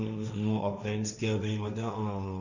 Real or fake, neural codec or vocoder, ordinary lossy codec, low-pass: fake; codec, 16 kHz, 1.1 kbps, Voila-Tokenizer; none; 7.2 kHz